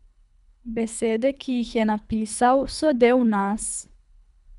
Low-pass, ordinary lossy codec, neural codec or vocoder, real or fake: 10.8 kHz; none; codec, 24 kHz, 3 kbps, HILCodec; fake